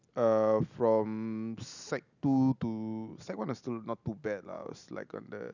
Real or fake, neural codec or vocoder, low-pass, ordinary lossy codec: real; none; 7.2 kHz; none